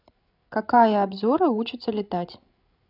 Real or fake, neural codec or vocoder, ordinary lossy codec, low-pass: fake; codec, 16 kHz, 16 kbps, FreqCodec, larger model; none; 5.4 kHz